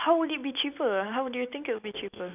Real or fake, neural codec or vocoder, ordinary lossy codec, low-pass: real; none; none; 3.6 kHz